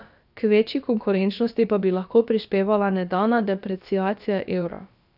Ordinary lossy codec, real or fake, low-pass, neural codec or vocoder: none; fake; 5.4 kHz; codec, 16 kHz, about 1 kbps, DyCAST, with the encoder's durations